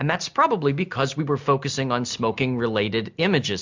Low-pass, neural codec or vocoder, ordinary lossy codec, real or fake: 7.2 kHz; none; MP3, 64 kbps; real